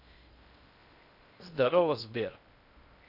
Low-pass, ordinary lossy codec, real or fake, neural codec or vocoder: 5.4 kHz; none; fake; codec, 16 kHz in and 24 kHz out, 0.6 kbps, FocalCodec, streaming, 2048 codes